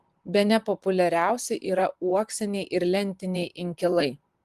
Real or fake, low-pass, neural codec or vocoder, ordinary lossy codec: fake; 14.4 kHz; vocoder, 44.1 kHz, 128 mel bands, Pupu-Vocoder; Opus, 24 kbps